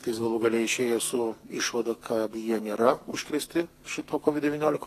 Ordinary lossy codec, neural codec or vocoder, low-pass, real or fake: AAC, 48 kbps; codec, 44.1 kHz, 2.6 kbps, SNAC; 14.4 kHz; fake